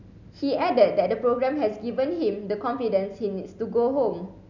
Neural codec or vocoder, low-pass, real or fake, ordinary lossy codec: none; 7.2 kHz; real; none